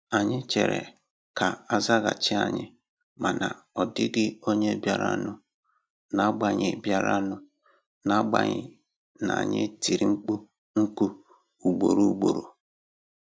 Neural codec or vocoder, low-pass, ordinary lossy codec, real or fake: none; none; none; real